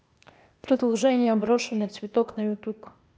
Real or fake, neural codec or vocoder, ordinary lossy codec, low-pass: fake; codec, 16 kHz, 0.8 kbps, ZipCodec; none; none